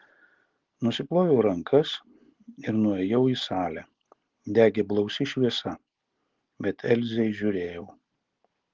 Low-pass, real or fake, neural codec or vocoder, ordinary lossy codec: 7.2 kHz; real; none; Opus, 16 kbps